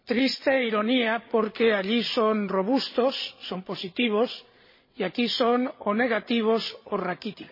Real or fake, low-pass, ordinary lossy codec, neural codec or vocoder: fake; 5.4 kHz; MP3, 24 kbps; codec, 16 kHz, 16 kbps, FreqCodec, larger model